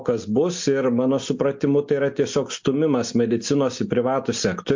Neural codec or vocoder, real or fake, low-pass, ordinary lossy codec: none; real; 7.2 kHz; MP3, 48 kbps